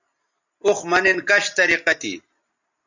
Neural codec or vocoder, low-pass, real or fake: none; 7.2 kHz; real